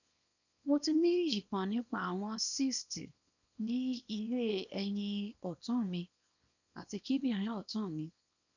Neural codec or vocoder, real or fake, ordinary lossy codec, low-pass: codec, 24 kHz, 0.9 kbps, WavTokenizer, small release; fake; none; 7.2 kHz